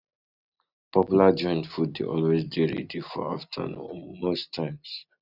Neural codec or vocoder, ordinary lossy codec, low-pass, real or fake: none; none; 5.4 kHz; real